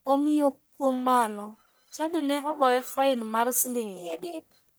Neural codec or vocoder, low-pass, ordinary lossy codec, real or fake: codec, 44.1 kHz, 1.7 kbps, Pupu-Codec; none; none; fake